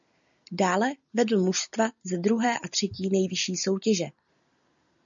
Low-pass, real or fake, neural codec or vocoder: 7.2 kHz; real; none